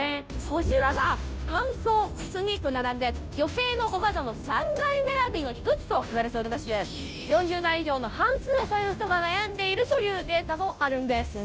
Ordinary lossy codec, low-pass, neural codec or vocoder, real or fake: none; none; codec, 16 kHz, 0.5 kbps, FunCodec, trained on Chinese and English, 25 frames a second; fake